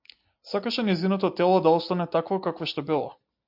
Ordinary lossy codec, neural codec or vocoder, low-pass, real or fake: MP3, 48 kbps; none; 5.4 kHz; real